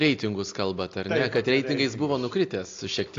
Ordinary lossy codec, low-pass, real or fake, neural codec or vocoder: AAC, 48 kbps; 7.2 kHz; real; none